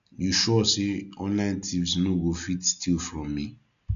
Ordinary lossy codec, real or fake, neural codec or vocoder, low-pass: none; real; none; 7.2 kHz